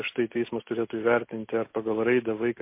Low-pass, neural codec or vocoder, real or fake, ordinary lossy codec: 3.6 kHz; none; real; MP3, 24 kbps